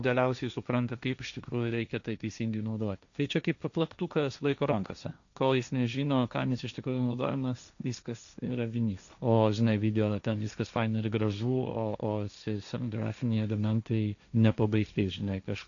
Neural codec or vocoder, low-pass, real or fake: codec, 16 kHz, 1.1 kbps, Voila-Tokenizer; 7.2 kHz; fake